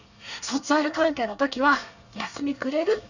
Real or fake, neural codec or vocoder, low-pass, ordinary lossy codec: fake; codec, 24 kHz, 1 kbps, SNAC; 7.2 kHz; none